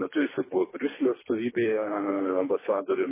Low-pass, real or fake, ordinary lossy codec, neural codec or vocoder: 3.6 kHz; fake; MP3, 16 kbps; codec, 24 kHz, 3 kbps, HILCodec